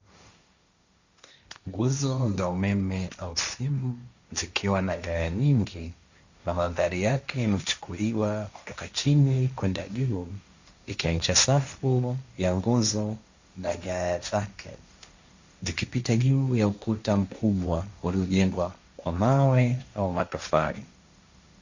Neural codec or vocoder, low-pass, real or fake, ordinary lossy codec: codec, 16 kHz, 1.1 kbps, Voila-Tokenizer; 7.2 kHz; fake; Opus, 64 kbps